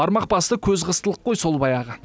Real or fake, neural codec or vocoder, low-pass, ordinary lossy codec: real; none; none; none